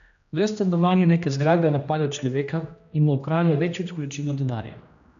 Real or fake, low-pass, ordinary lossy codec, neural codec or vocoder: fake; 7.2 kHz; none; codec, 16 kHz, 1 kbps, X-Codec, HuBERT features, trained on general audio